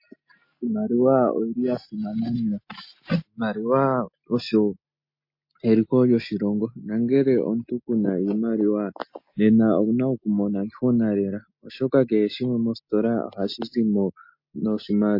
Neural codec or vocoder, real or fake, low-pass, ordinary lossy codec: none; real; 5.4 kHz; MP3, 32 kbps